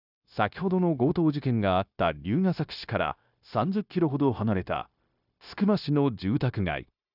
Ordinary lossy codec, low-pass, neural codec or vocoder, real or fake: none; 5.4 kHz; codec, 16 kHz, 0.9 kbps, LongCat-Audio-Codec; fake